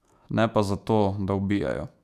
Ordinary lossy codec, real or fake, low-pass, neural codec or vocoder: none; fake; 14.4 kHz; autoencoder, 48 kHz, 128 numbers a frame, DAC-VAE, trained on Japanese speech